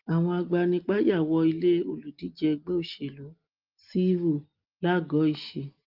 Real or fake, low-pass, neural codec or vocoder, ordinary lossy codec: real; 5.4 kHz; none; Opus, 32 kbps